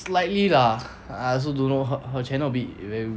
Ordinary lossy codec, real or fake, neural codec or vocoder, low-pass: none; real; none; none